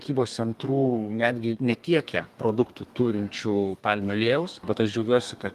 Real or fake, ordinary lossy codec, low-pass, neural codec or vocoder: fake; Opus, 32 kbps; 14.4 kHz; codec, 32 kHz, 1.9 kbps, SNAC